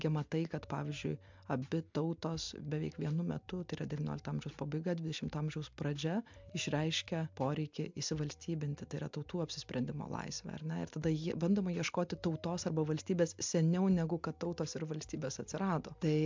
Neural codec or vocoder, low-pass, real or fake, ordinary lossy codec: none; 7.2 kHz; real; MP3, 64 kbps